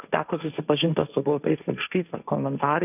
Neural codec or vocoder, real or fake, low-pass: codec, 16 kHz, 1.1 kbps, Voila-Tokenizer; fake; 3.6 kHz